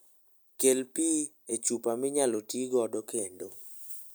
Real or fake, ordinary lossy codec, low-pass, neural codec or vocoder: real; none; none; none